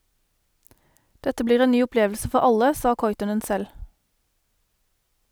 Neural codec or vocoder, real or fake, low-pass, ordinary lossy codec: none; real; none; none